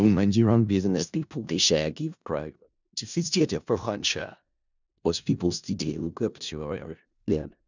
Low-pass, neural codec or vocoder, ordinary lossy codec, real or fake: 7.2 kHz; codec, 16 kHz in and 24 kHz out, 0.4 kbps, LongCat-Audio-Codec, four codebook decoder; none; fake